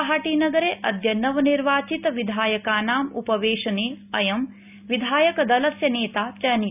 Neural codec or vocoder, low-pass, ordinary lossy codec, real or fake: none; 3.6 kHz; none; real